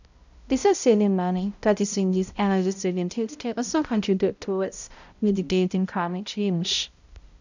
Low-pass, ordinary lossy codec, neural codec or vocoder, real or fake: 7.2 kHz; none; codec, 16 kHz, 0.5 kbps, X-Codec, HuBERT features, trained on balanced general audio; fake